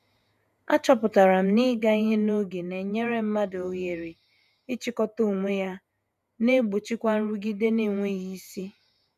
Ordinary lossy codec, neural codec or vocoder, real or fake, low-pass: none; vocoder, 48 kHz, 128 mel bands, Vocos; fake; 14.4 kHz